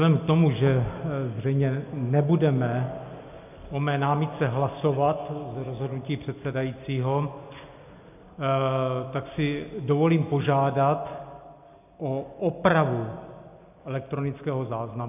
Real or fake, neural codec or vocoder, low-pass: real; none; 3.6 kHz